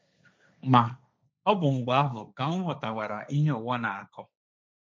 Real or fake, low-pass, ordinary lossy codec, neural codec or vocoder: fake; none; none; codec, 16 kHz, 1.1 kbps, Voila-Tokenizer